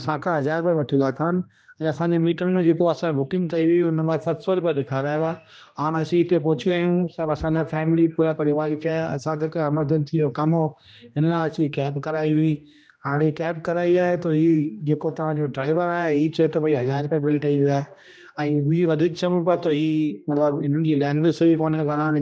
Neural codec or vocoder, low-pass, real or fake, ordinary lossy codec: codec, 16 kHz, 1 kbps, X-Codec, HuBERT features, trained on general audio; none; fake; none